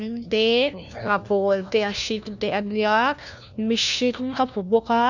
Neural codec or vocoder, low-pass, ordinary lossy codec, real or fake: codec, 16 kHz, 1 kbps, FunCodec, trained on LibriTTS, 50 frames a second; 7.2 kHz; none; fake